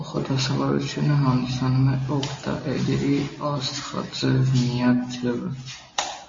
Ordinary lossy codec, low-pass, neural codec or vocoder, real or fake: MP3, 32 kbps; 7.2 kHz; none; real